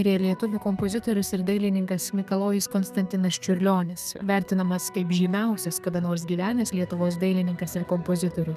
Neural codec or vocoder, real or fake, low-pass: codec, 32 kHz, 1.9 kbps, SNAC; fake; 14.4 kHz